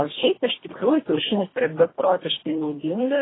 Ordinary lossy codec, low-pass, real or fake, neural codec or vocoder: AAC, 16 kbps; 7.2 kHz; fake; codec, 24 kHz, 1.5 kbps, HILCodec